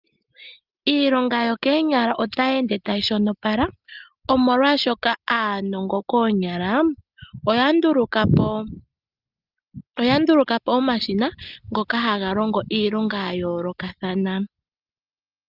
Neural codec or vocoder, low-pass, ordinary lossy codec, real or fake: none; 5.4 kHz; Opus, 24 kbps; real